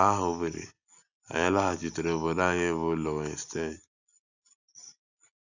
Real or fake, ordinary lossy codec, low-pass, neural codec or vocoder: real; none; 7.2 kHz; none